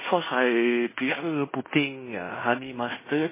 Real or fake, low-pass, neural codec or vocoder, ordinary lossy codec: fake; 3.6 kHz; codec, 16 kHz in and 24 kHz out, 0.9 kbps, LongCat-Audio-Codec, fine tuned four codebook decoder; MP3, 16 kbps